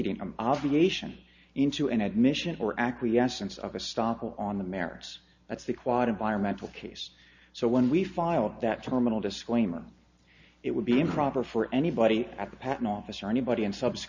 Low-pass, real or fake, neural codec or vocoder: 7.2 kHz; real; none